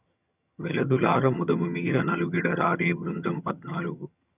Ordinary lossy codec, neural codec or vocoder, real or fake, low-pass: none; vocoder, 22.05 kHz, 80 mel bands, HiFi-GAN; fake; 3.6 kHz